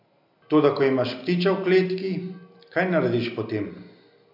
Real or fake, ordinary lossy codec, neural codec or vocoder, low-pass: real; MP3, 48 kbps; none; 5.4 kHz